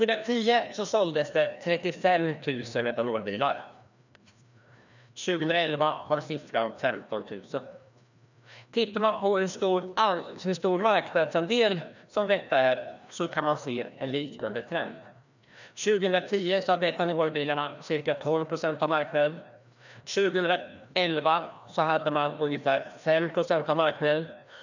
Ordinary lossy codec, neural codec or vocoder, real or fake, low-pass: none; codec, 16 kHz, 1 kbps, FreqCodec, larger model; fake; 7.2 kHz